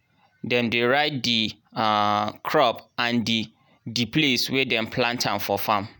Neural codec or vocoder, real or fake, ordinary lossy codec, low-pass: none; real; none; none